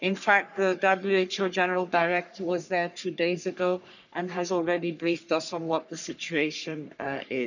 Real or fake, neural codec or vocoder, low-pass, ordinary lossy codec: fake; codec, 44.1 kHz, 3.4 kbps, Pupu-Codec; 7.2 kHz; none